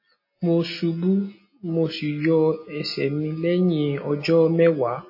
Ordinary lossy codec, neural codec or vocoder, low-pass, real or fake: MP3, 24 kbps; none; 5.4 kHz; real